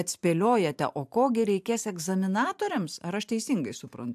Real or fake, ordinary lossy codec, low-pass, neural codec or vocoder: fake; AAC, 96 kbps; 14.4 kHz; vocoder, 44.1 kHz, 128 mel bands every 512 samples, BigVGAN v2